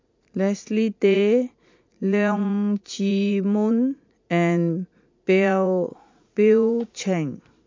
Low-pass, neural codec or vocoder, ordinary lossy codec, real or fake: 7.2 kHz; vocoder, 44.1 kHz, 80 mel bands, Vocos; MP3, 48 kbps; fake